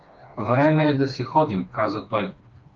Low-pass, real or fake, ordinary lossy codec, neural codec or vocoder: 7.2 kHz; fake; Opus, 32 kbps; codec, 16 kHz, 2 kbps, FreqCodec, smaller model